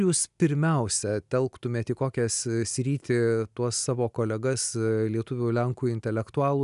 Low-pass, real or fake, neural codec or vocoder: 10.8 kHz; real; none